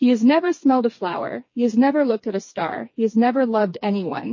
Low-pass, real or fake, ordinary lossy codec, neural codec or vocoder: 7.2 kHz; fake; MP3, 32 kbps; codec, 16 kHz, 4 kbps, FreqCodec, smaller model